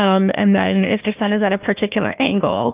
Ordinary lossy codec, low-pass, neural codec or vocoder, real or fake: Opus, 24 kbps; 3.6 kHz; codec, 16 kHz, 1 kbps, FunCodec, trained on Chinese and English, 50 frames a second; fake